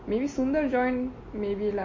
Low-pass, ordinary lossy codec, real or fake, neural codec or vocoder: 7.2 kHz; MP3, 32 kbps; real; none